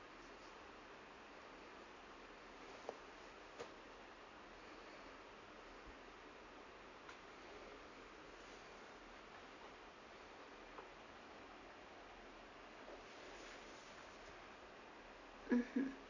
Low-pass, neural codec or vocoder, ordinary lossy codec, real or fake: 7.2 kHz; none; AAC, 48 kbps; real